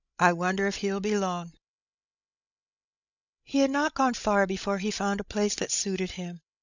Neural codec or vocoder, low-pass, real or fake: codec, 16 kHz, 16 kbps, FreqCodec, larger model; 7.2 kHz; fake